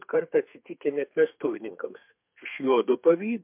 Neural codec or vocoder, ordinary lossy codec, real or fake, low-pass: codec, 32 kHz, 1.9 kbps, SNAC; MP3, 32 kbps; fake; 3.6 kHz